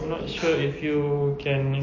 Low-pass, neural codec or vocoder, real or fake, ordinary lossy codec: 7.2 kHz; none; real; MP3, 32 kbps